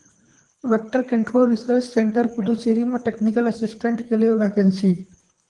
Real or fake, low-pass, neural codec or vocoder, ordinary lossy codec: fake; 10.8 kHz; codec, 24 kHz, 3 kbps, HILCodec; Opus, 24 kbps